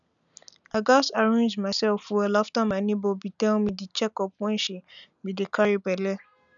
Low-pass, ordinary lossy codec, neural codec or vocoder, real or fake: 7.2 kHz; none; none; real